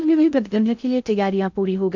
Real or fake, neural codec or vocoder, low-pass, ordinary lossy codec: fake; codec, 16 kHz in and 24 kHz out, 0.6 kbps, FocalCodec, streaming, 2048 codes; 7.2 kHz; MP3, 64 kbps